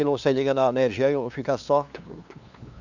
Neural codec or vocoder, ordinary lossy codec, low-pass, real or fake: codec, 16 kHz, 2 kbps, FunCodec, trained on LibriTTS, 25 frames a second; none; 7.2 kHz; fake